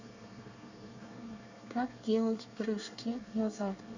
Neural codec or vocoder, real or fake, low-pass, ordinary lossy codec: codec, 24 kHz, 1 kbps, SNAC; fake; 7.2 kHz; none